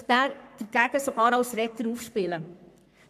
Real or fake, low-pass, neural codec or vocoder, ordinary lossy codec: fake; 14.4 kHz; codec, 44.1 kHz, 3.4 kbps, Pupu-Codec; none